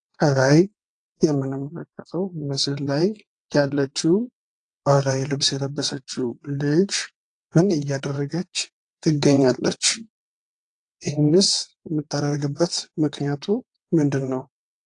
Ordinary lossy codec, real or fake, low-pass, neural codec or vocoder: AAC, 48 kbps; fake; 9.9 kHz; vocoder, 22.05 kHz, 80 mel bands, WaveNeXt